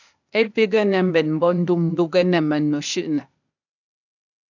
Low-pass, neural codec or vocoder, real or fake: 7.2 kHz; codec, 16 kHz, 0.8 kbps, ZipCodec; fake